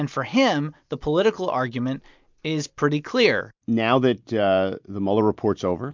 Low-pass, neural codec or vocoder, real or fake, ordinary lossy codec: 7.2 kHz; none; real; MP3, 64 kbps